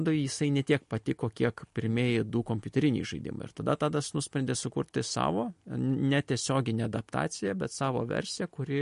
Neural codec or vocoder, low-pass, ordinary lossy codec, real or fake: none; 10.8 kHz; MP3, 48 kbps; real